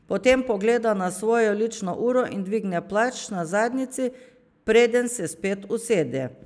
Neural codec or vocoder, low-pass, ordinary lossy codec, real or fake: none; none; none; real